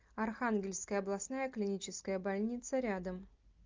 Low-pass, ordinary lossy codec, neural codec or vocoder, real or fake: 7.2 kHz; Opus, 24 kbps; none; real